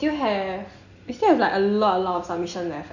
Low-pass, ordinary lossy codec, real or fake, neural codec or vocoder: 7.2 kHz; none; real; none